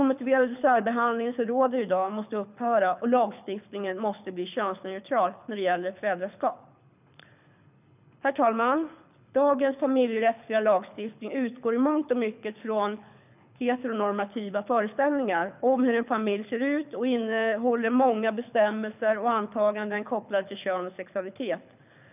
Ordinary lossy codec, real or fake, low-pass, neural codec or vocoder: none; fake; 3.6 kHz; codec, 24 kHz, 6 kbps, HILCodec